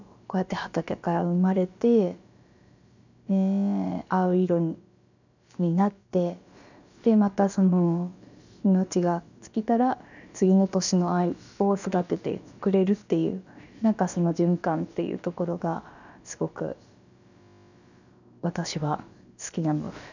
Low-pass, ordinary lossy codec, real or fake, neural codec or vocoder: 7.2 kHz; none; fake; codec, 16 kHz, about 1 kbps, DyCAST, with the encoder's durations